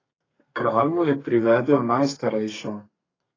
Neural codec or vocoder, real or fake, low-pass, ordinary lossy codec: codec, 32 kHz, 1.9 kbps, SNAC; fake; 7.2 kHz; AAC, 32 kbps